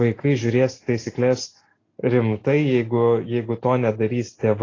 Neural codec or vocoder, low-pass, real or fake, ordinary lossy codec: none; 7.2 kHz; real; AAC, 32 kbps